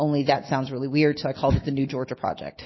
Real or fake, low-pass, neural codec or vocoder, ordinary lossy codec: real; 7.2 kHz; none; MP3, 24 kbps